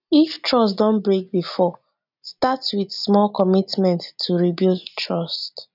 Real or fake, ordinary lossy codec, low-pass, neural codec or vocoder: real; none; 5.4 kHz; none